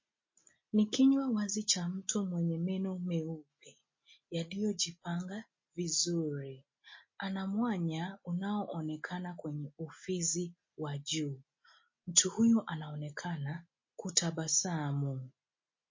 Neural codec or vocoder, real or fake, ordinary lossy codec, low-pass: none; real; MP3, 32 kbps; 7.2 kHz